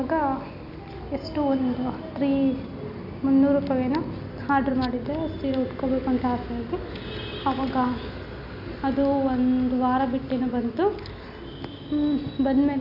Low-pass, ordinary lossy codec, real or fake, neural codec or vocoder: 5.4 kHz; none; real; none